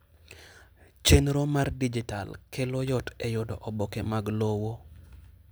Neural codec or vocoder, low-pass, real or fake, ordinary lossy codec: none; none; real; none